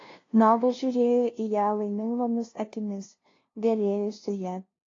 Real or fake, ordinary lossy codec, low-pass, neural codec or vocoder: fake; AAC, 32 kbps; 7.2 kHz; codec, 16 kHz, 0.5 kbps, FunCodec, trained on LibriTTS, 25 frames a second